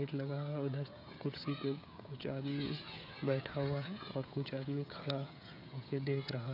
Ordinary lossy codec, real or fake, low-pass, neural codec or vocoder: none; fake; 5.4 kHz; codec, 16 kHz, 16 kbps, FreqCodec, smaller model